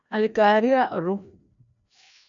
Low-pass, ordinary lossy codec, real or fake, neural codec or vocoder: 7.2 kHz; MP3, 96 kbps; fake; codec, 16 kHz, 1 kbps, FreqCodec, larger model